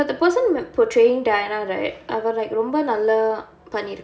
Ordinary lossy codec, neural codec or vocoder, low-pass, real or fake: none; none; none; real